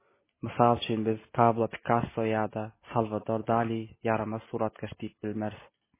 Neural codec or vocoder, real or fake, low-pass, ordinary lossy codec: none; real; 3.6 kHz; MP3, 16 kbps